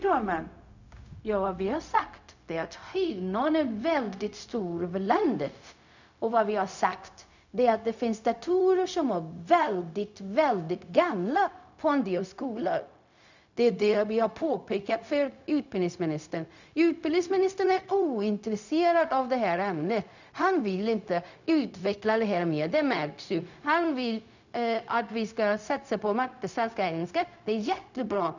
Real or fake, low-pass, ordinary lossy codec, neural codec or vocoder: fake; 7.2 kHz; none; codec, 16 kHz, 0.4 kbps, LongCat-Audio-Codec